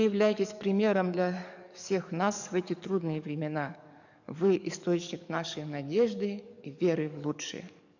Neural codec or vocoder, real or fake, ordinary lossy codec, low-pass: codec, 44.1 kHz, 7.8 kbps, DAC; fake; none; 7.2 kHz